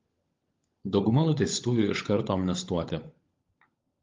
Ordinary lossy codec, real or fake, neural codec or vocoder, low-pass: Opus, 32 kbps; fake; codec, 16 kHz, 16 kbps, FunCodec, trained on LibriTTS, 50 frames a second; 7.2 kHz